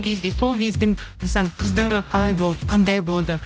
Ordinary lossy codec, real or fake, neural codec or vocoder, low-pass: none; fake; codec, 16 kHz, 0.5 kbps, X-Codec, HuBERT features, trained on general audio; none